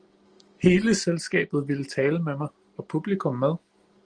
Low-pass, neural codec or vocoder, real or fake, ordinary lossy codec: 9.9 kHz; none; real; Opus, 24 kbps